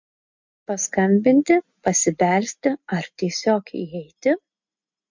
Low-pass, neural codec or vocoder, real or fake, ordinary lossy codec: 7.2 kHz; none; real; MP3, 48 kbps